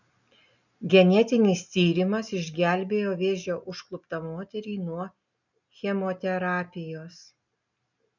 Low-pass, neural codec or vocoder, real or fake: 7.2 kHz; none; real